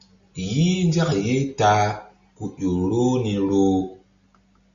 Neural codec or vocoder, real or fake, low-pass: none; real; 7.2 kHz